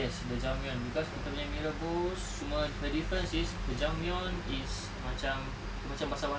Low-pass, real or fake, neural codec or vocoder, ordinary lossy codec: none; real; none; none